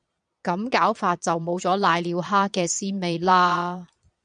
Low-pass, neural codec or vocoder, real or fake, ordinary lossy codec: 9.9 kHz; vocoder, 22.05 kHz, 80 mel bands, Vocos; fake; MP3, 96 kbps